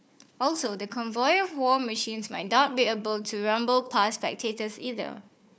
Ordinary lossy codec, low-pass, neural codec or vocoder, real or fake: none; none; codec, 16 kHz, 4 kbps, FunCodec, trained on Chinese and English, 50 frames a second; fake